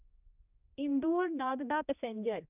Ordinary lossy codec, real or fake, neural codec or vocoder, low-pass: none; fake; codec, 16 kHz, 1 kbps, X-Codec, HuBERT features, trained on general audio; 3.6 kHz